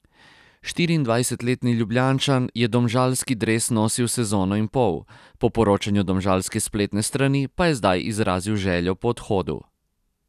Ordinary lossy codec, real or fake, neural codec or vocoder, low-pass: none; real; none; 14.4 kHz